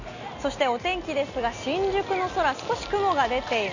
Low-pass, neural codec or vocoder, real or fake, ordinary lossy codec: 7.2 kHz; none; real; none